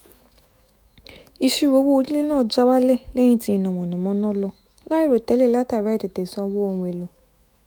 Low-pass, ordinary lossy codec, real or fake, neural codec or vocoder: none; none; fake; autoencoder, 48 kHz, 128 numbers a frame, DAC-VAE, trained on Japanese speech